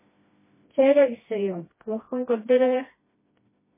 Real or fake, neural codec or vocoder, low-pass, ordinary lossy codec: fake; codec, 16 kHz, 1 kbps, FreqCodec, smaller model; 3.6 kHz; MP3, 16 kbps